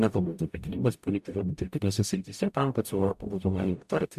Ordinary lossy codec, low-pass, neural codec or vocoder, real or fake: MP3, 96 kbps; 14.4 kHz; codec, 44.1 kHz, 0.9 kbps, DAC; fake